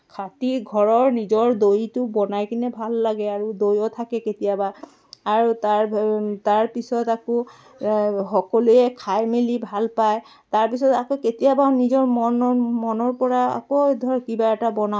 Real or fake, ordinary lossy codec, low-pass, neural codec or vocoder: real; none; none; none